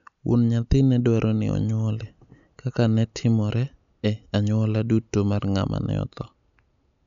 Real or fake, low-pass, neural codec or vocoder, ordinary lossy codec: real; 7.2 kHz; none; MP3, 96 kbps